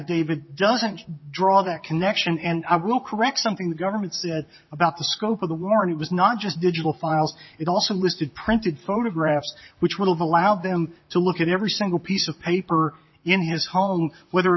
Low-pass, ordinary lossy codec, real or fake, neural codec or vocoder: 7.2 kHz; MP3, 24 kbps; fake; vocoder, 44.1 kHz, 128 mel bands, Pupu-Vocoder